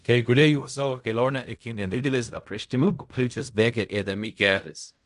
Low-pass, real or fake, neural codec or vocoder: 10.8 kHz; fake; codec, 16 kHz in and 24 kHz out, 0.4 kbps, LongCat-Audio-Codec, fine tuned four codebook decoder